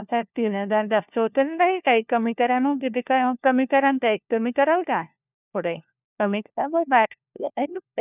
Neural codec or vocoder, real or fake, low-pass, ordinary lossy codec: codec, 16 kHz, 1 kbps, FunCodec, trained on LibriTTS, 50 frames a second; fake; 3.6 kHz; none